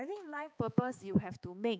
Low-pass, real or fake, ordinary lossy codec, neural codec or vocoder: none; fake; none; codec, 16 kHz, 4 kbps, X-Codec, HuBERT features, trained on balanced general audio